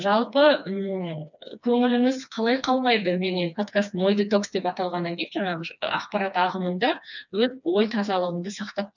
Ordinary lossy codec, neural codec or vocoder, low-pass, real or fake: none; codec, 16 kHz, 2 kbps, FreqCodec, smaller model; 7.2 kHz; fake